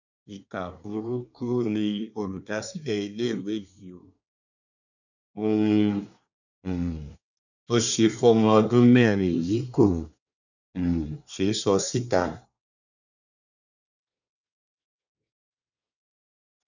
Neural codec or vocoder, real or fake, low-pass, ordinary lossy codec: codec, 24 kHz, 1 kbps, SNAC; fake; 7.2 kHz; none